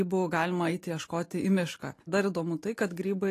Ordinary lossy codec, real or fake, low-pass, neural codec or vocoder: AAC, 48 kbps; real; 14.4 kHz; none